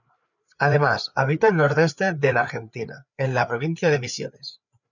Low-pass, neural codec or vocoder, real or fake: 7.2 kHz; codec, 16 kHz, 4 kbps, FreqCodec, larger model; fake